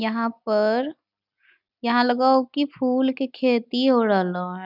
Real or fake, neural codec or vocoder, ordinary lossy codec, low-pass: real; none; none; 5.4 kHz